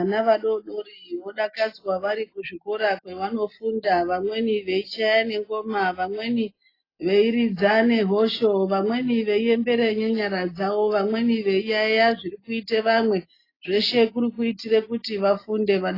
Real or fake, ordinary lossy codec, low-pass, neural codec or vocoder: real; AAC, 24 kbps; 5.4 kHz; none